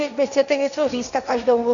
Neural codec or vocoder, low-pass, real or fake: codec, 16 kHz, 1.1 kbps, Voila-Tokenizer; 7.2 kHz; fake